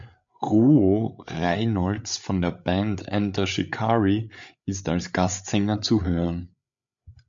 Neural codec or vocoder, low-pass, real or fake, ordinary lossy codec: codec, 16 kHz, 8 kbps, FreqCodec, larger model; 7.2 kHz; fake; MP3, 64 kbps